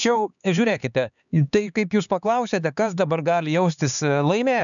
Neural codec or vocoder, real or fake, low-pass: codec, 16 kHz, 4 kbps, X-Codec, HuBERT features, trained on LibriSpeech; fake; 7.2 kHz